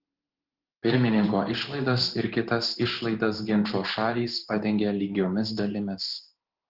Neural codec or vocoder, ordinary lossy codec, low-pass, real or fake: codec, 16 kHz in and 24 kHz out, 1 kbps, XY-Tokenizer; Opus, 16 kbps; 5.4 kHz; fake